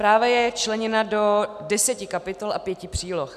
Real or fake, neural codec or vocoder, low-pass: real; none; 14.4 kHz